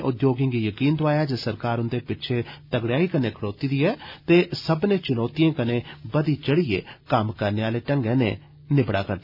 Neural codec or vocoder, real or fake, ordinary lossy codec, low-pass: none; real; none; 5.4 kHz